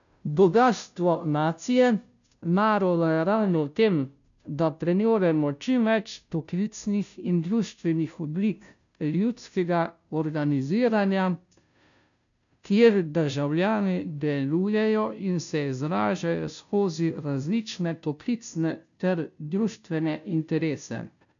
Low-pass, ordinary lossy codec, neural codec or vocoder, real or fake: 7.2 kHz; none; codec, 16 kHz, 0.5 kbps, FunCodec, trained on Chinese and English, 25 frames a second; fake